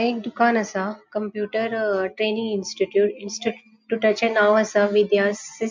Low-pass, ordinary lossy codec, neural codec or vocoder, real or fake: 7.2 kHz; MP3, 64 kbps; none; real